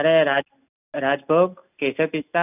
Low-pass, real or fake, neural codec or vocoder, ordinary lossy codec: 3.6 kHz; real; none; none